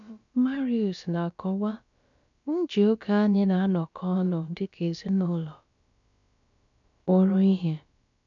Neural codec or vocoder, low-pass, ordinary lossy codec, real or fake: codec, 16 kHz, about 1 kbps, DyCAST, with the encoder's durations; 7.2 kHz; none; fake